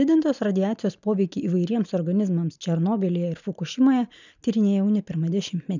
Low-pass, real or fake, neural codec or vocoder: 7.2 kHz; real; none